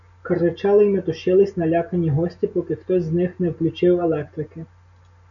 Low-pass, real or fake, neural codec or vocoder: 7.2 kHz; real; none